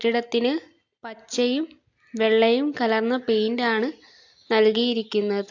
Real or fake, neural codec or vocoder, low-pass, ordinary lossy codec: real; none; 7.2 kHz; AAC, 48 kbps